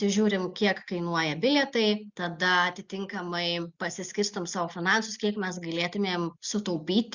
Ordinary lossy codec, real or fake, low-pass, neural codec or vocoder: Opus, 64 kbps; real; 7.2 kHz; none